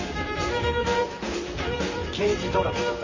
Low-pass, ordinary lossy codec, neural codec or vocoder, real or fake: 7.2 kHz; MP3, 32 kbps; vocoder, 44.1 kHz, 128 mel bands, Pupu-Vocoder; fake